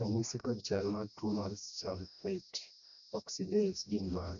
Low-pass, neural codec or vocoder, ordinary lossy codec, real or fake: 7.2 kHz; codec, 16 kHz, 1 kbps, FreqCodec, smaller model; none; fake